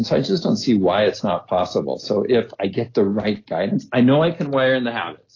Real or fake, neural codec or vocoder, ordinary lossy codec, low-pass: real; none; AAC, 32 kbps; 7.2 kHz